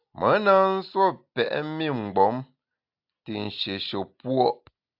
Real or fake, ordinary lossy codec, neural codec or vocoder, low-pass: real; AAC, 48 kbps; none; 5.4 kHz